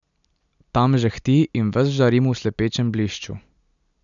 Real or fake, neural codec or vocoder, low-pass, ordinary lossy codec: real; none; 7.2 kHz; none